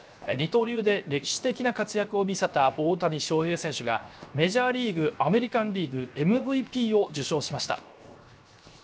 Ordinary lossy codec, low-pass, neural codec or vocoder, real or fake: none; none; codec, 16 kHz, 0.7 kbps, FocalCodec; fake